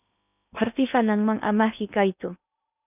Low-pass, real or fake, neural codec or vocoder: 3.6 kHz; fake; codec, 16 kHz in and 24 kHz out, 0.8 kbps, FocalCodec, streaming, 65536 codes